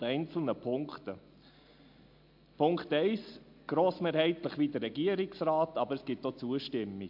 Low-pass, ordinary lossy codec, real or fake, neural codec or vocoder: 5.4 kHz; MP3, 48 kbps; real; none